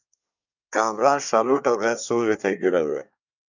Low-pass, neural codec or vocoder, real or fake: 7.2 kHz; codec, 24 kHz, 1 kbps, SNAC; fake